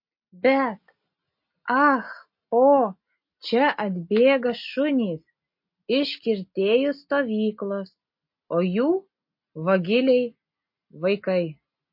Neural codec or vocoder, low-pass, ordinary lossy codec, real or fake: none; 5.4 kHz; MP3, 32 kbps; real